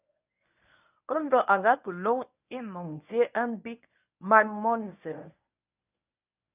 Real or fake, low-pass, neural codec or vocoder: fake; 3.6 kHz; codec, 24 kHz, 0.9 kbps, WavTokenizer, medium speech release version 1